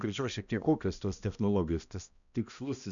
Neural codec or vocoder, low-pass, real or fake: codec, 16 kHz, 1 kbps, X-Codec, HuBERT features, trained on balanced general audio; 7.2 kHz; fake